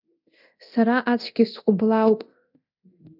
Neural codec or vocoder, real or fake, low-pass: codec, 24 kHz, 0.9 kbps, DualCodec; fake; 5.4 kHz